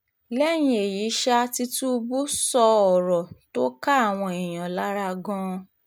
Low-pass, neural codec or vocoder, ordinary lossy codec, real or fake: none; none; none; real